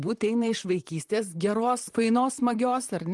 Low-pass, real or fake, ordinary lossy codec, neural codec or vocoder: 10.8 kHz; fake; Opus, 24 kbps; vocoder, 44.1 kHz, 128 mel bands, Pupu-Vocoder